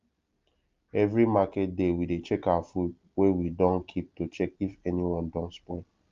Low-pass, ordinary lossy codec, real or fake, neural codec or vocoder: 7.2 kHz; Opus, 32 kbps; real; none